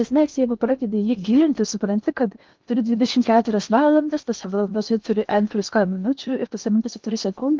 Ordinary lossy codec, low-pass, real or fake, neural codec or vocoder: Opus, 24 kbps; 7.2 kHz; fake; codec, 16 kHz in and 24 kHz out, 0.8 kbps, FocalCodec, streaming, 65536 codes